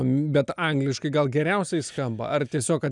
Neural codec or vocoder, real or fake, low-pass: none; real; 10.8 kHz